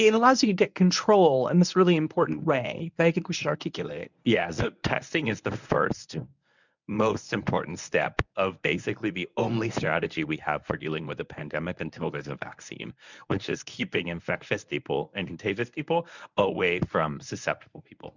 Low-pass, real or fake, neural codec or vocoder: 7.2 kHz; fake; codec, 24 kHz, 0.9 kbps, WavTokenizer, medium speech release version 1